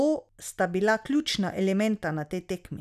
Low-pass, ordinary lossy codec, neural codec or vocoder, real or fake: 14.4 kHz; none; none; real